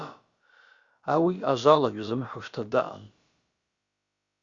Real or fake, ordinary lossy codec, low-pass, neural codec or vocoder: fake; Opus, 64 kbps; 7.2 kHz; codec, 16 kHz, about 1 kbps, DyCAST, with the encoder's durations